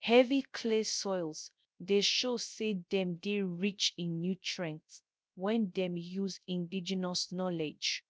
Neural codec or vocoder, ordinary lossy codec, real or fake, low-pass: codec, 16 kHz, 0.3 kbps, FocalCodec; none; fake; none